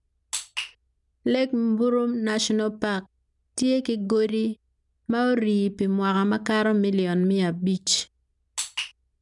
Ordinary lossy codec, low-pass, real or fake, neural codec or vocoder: none; 10.8 kHz; real; none